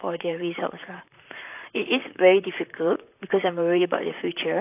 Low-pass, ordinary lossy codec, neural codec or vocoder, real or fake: 3.6 kHz; AAC, 32 kbps; vocoder, 44.1 kHz, 128 mel bands, Pupu-Vocoder; fake